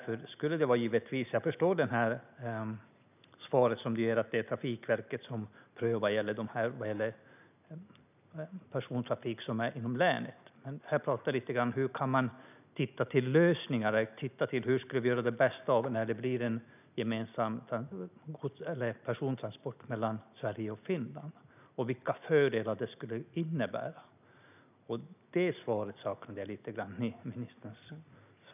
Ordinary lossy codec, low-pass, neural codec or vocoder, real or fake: none; 3.6 kHz; none; real